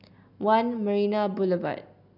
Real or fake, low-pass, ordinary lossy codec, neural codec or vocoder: real; 5.4 kHz; none; none